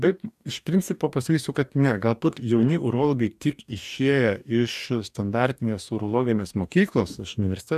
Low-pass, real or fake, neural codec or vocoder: 14.4 kHz; fake; codec, 44.1 kHz, 2.6 kbps, DAC